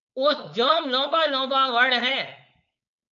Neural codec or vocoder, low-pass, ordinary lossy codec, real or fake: codec, 16 kHz, 4.8 kbps, FACodec; 7.2 kHz; MP3, 64 kbps; fake